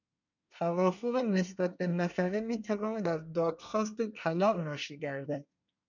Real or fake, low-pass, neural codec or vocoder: fake; 7.2 kHz; codec, 24 kHz, 1 kbps, SNAC